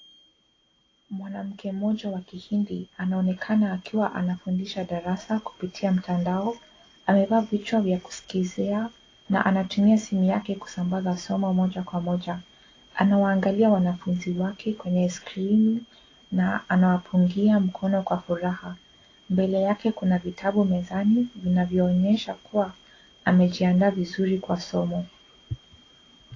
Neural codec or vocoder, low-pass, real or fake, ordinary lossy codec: none; 7.2 kHz; real; AAC, 32 kbps